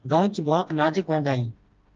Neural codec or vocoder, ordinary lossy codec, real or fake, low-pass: codec, 16 kHz, 1 kbps, FreqCodec, smaller model; Opus, 24 kbps; fake; 7.2 kHz